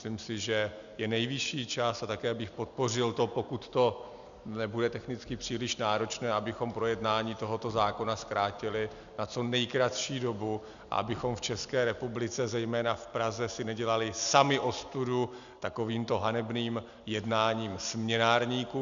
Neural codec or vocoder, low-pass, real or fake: none; 7.2 kHz; real